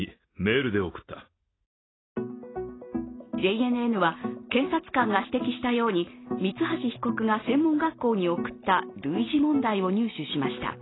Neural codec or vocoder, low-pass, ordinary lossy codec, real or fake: none; 7.2 kHz; AAC, 16 kbps; real